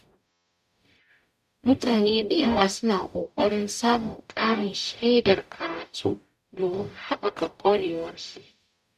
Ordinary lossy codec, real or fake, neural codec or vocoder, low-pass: none; fake; codec, 44.1 kHz, 0.9 kbps, DAC; 14.4 kHz